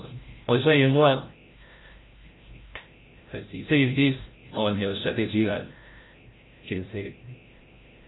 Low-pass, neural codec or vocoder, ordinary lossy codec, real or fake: 7.2 kHz; codec, 16 kHz, 0.5 kbps, FreqCodec, larger model; AAC, 16 kbps; fake